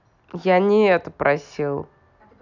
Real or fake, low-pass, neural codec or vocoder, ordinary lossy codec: real; 7.2 kHz; none; none